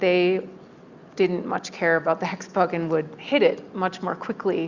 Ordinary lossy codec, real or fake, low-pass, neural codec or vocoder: Opus, 64 kbps; real; 7.2 kHz; none